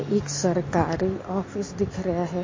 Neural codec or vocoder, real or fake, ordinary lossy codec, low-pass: none; real; MP3, 32 kbps; 7.2 kHz